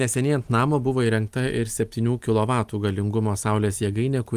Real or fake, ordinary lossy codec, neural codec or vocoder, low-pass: real; Opus, 32 kbps; none; 14.4 kHz